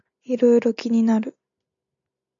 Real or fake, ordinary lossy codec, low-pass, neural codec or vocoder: real; MP3, 96 kbps; 7.2 kHz; none